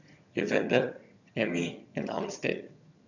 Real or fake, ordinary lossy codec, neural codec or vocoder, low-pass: fake; none; vocoder, 22.05 kHz, 80 mel bands, HiFi-GAN; 7.2 kHz